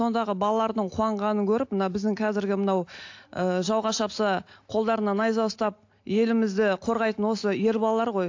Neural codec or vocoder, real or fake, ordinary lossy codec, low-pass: none; real; AAC, 48 kbps; 7.2 kHz